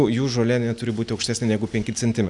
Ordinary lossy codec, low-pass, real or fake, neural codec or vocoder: MP3, 96 kbps; 10.8 kHz; real; none